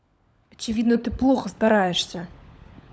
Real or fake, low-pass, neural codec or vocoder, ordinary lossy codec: fake; none; codec, 16 kHz, 16 kbps, FunCodec, trained on LibriTTS, 50 frames a second; none